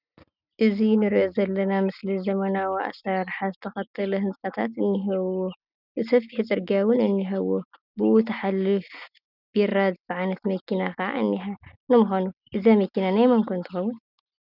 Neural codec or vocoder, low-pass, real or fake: none; 5.4 kHz; real